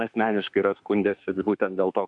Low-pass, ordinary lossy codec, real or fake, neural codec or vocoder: 10.8 kHz; MP3, 64 kbps; fake; autoencoder, 48 kHz, 32 numbers a frame, DAC-VAE, trained on Japanese speech